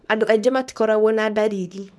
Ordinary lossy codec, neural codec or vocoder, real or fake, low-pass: none; codec, 24 kHz, 0.9 kbps, WavTokenizer, small release; fake; none